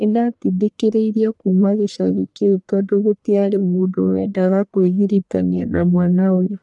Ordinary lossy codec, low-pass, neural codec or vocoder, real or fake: none; 10.8 kHz; codec, 44.1 kHz, 1.7 kbps, Pupu-Codec; fake